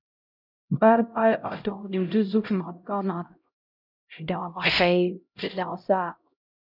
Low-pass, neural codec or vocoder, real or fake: 5.4 kHz; codec, 16 kHz, 0.5 kbps, X-Codec, HuBERT features, trained on LibriSpeech; fake